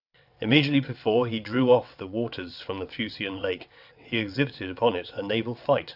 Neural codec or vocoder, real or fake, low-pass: vocoder, 22.05 kHz, 80 mel bands, WaveNeXt; fake; 5.4 kHz